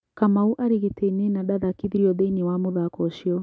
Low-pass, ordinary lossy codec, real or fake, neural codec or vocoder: none; none; real; none